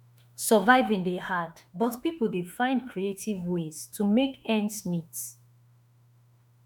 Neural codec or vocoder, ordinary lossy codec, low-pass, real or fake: autoencoder, 48 kHz, 32 numbers a frame, DAC-VAE, trained on Japanese speech; none; none; fake